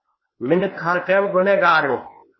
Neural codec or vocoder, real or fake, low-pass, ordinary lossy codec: codec, 16 kHz, 0.8 kbps, ZipCodec; fake; 7.2 kHz; MP3, 24 kbps